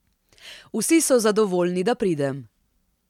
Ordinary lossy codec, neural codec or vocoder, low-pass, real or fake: MP3, 96 kbps; none; 19.8 kHz; real